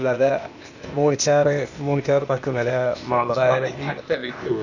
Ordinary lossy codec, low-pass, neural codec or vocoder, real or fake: none; 7.2 kHz; codec, 16 kHz, 0.8 kbps, ZipCodec; fake